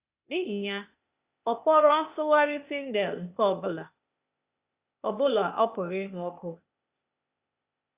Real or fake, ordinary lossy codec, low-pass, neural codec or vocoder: fake; Opus, 64 kbps; 3.6 kHz; codec, 16 kHz, 0.8 kbps, ZipCodec